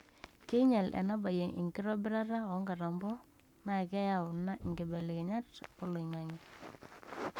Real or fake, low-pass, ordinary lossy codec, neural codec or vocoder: fake; 19.8 kHz; none; codec, 44.1 kHz, 7.8 kbps, Pupu-Codec